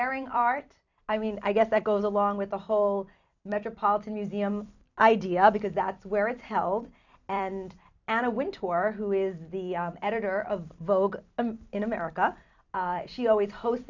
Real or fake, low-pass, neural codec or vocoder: fake; 7.2 kHz; vocoder, 44.1 kHz, 128 mel bands every 256 samples, BigVGAN v2